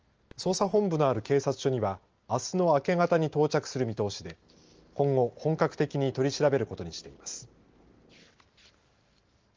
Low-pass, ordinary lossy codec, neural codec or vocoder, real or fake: 7.2 kHz; Opus, 24 kbps; none; real